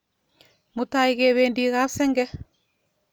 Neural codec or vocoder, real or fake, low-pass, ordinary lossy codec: none; real; none; none